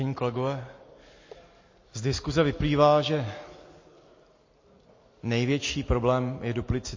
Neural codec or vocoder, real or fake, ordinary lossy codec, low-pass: none; real; MP3, 32 kbps; 7.2 kHz